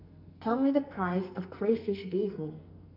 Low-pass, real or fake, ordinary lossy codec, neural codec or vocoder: 5.4 kHz; fake; none; codec, 44.1 kHz, 2.6 kbps, SNAC